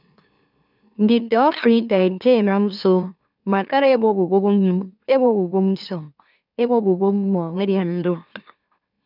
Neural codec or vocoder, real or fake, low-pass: autoencoder, 44.1 kHz, a latent of 192 numbers a frame, MeloTTS; fake; 5.4 kHz